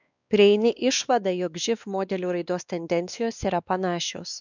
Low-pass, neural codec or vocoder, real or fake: 7.2 kHz; codec, 16 kHz, 2 kbps, X-Codec, WavLM features, trained on Multilingual LibriSpeech; fake